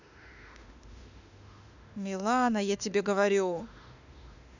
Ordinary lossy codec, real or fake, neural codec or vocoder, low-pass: none; fake; autoencoder, 48 kHz, 32 numbers a frame, DAC-VAE, trained on Japanese speech; 7.2 kHz